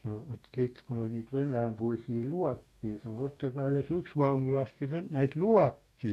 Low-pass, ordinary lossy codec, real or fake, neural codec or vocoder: 14.4 kHz; none; fake; codec, 44.1 kHz, 2.6 kbps, DAC